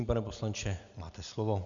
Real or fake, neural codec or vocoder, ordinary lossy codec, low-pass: real; none; MP3, 96 kbps; 7.2 kHz